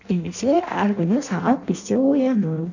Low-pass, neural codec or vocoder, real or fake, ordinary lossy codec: 7.2 kHz; codec, 16 kHz in and 24 kHz out, 0.6 kbps, FireRedTTS-2 codec; fake; none